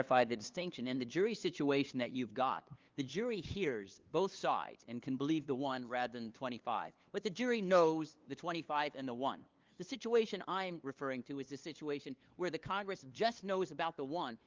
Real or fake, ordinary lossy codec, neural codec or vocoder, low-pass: fake; Opus, 32 kbps; codec, 16 kHz, 2 kbps, FunCodec, trained on LibriTTS, 25 frames a second; 7.2 kHz